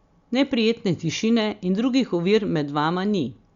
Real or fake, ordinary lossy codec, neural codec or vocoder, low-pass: real; Opus, 64 kbps; none; 7.2 kHz